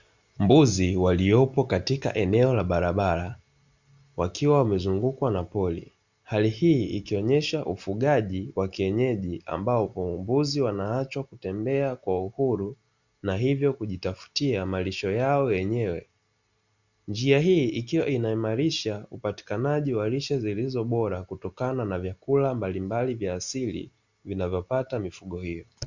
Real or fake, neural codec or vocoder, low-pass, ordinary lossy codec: real; none; 7.2 kHz; Opus, 64 kbps